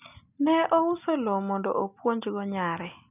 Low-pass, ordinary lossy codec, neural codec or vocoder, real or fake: 3.6 kHz; none; none; real